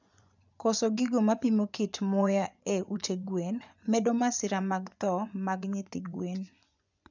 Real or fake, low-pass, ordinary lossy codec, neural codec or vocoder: fake; 7.2 kHz; none; vocoder, 24 kHz, 100 mel bands, Vocos